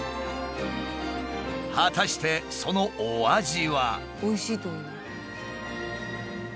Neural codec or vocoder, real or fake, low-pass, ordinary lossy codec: none; real; none; none